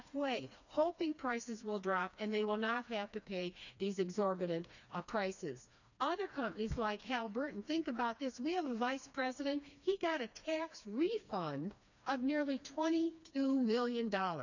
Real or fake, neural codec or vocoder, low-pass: fake; codec, 16 kHz, 2 kbps, FreqCodec, smaller model; 7.2 kHz